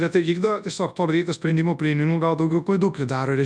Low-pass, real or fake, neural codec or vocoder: 9.9 kHz; fake; codec, 24 kHz, 0.9 kbps, WavTokenizer, large speech release